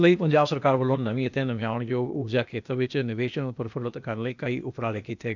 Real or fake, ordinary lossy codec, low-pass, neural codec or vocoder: fake; none; 7.2 kHz; codec, 16 kHz, 0.8 kbps, ZipCodec